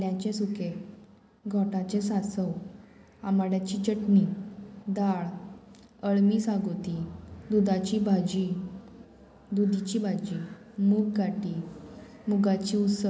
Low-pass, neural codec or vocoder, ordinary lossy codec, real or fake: none; none; none; real